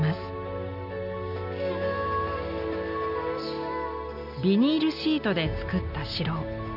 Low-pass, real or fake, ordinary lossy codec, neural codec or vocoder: 5.4 kHz; real; none; none